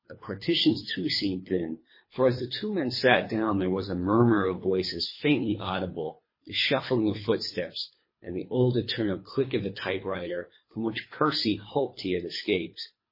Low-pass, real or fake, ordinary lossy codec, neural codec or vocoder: 5.4 kHz; fake; MP3, 24 kbps; codec, 24 kHz, 3 kbps, HILCodec